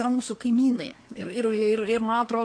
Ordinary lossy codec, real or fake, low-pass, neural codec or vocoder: AAC, 48 kbps; fake; 9.9 kHz; codec, 24 kHz, 1 kbps, SNAC